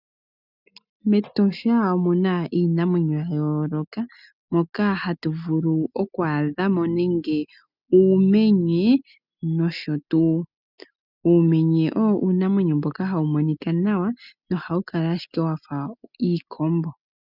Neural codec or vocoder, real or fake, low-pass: none; real; 5.4 kHz